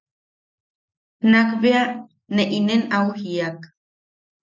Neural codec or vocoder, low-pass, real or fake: none; 7.2 kHz; real